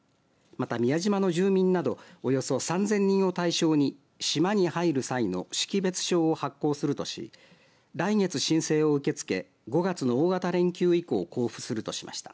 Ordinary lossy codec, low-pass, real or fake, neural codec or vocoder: none; none; real; none